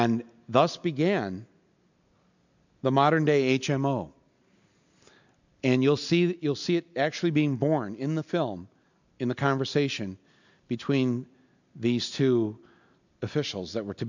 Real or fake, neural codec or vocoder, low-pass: real; none; 7.2 kHz